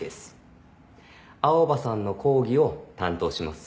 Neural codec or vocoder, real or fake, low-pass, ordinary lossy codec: none; real; none; none